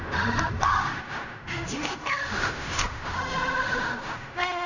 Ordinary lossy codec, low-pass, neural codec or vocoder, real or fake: none; 7.2 kHz; codec, 16 kHz in and 24 kHz out, 0.4 kbps, LongCat-Audio-Codec, fine tuned four codebook decoder; fake